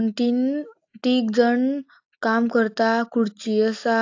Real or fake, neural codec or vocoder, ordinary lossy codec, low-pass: real; none; AAC, 48 kbps; 7.2 kHz